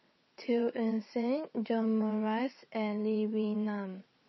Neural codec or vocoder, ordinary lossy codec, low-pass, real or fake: vocoder, 22.05 kHz, 80 mel bands, WaveNeXt; MP3, 24 kbps; 7.2 kHz; fake